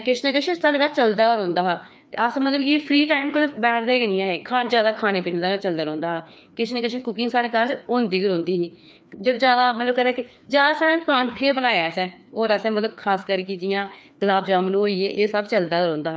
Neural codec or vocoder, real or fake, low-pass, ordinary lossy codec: codec, 16 kHz, 2 kbps, FreqCodec, larger model; fake; none; none